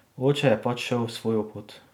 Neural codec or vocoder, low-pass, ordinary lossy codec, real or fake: none; 19.8 kHz; none; real